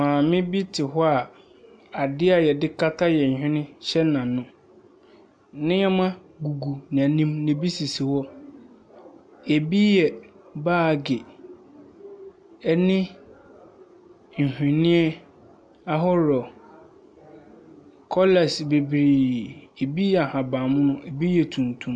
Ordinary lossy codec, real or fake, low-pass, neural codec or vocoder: MP3, 96 kbps; real; 9.9 kHz; none